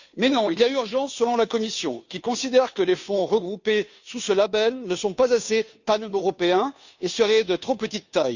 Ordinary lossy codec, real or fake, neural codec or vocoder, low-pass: none; fake; codec, 16 kHz, 2 kbps, FunCodec, trained on Chinese and English, 25 frames a second; 7.2 kHz